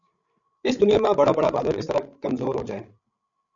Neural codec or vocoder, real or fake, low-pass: codec, 16 kHz, 16 kbps, FreqCodec, larger model; fake; 7.2 kHz